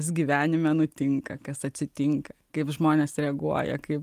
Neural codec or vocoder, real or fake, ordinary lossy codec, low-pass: none; real; Opus, 32 kbps; 14.4 kHz